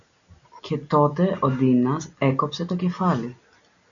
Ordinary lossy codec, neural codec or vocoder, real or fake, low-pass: MP3, 96 kbps; none; real; 7.2 kHz